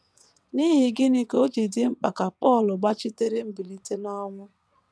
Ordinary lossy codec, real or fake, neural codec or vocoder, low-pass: none; fake; vocoder, 22.05 kHz, 80 mel bands, WaveNeXt; none